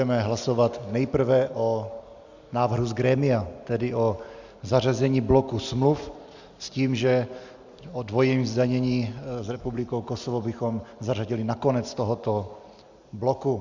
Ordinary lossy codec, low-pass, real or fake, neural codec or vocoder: Opus, 64 kbps; 7.2 kHz; real; none